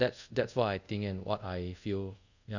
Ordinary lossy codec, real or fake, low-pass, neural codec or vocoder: none; fake; 7.2 kHz; codec, 24 kHz, 0.5 kbps, DualCodec